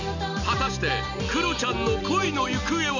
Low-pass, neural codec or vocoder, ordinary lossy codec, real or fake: 7.2 kHz; none; none; real